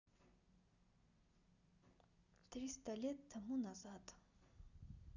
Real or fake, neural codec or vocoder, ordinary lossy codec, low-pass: real; none; none; 7.2 kHz